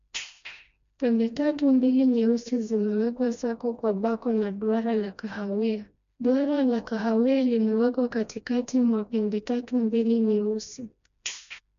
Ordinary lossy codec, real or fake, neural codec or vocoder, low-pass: MP3, 64 kbps; fake; codec, 16 kHz, 1 kbps, FreqCodec, smaller model; 7.2 kHz